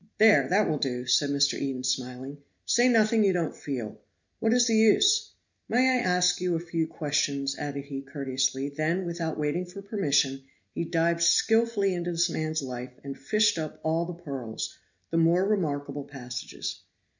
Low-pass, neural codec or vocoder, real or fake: 7.2 kHz; none; real